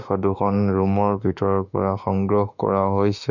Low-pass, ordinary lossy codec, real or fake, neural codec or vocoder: 7.2 kHz; none; fake; autoencoder, 48 kHz, 32 numbers a frame, DAC-VAE, trained on Japanese speech